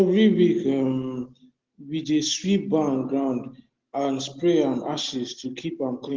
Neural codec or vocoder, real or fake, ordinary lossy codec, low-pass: none; real; Opus, 16 kbps; 7.2 kHz